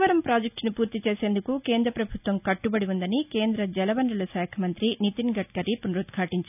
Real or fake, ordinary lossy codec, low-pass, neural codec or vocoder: real; none; 3.6 kHz; none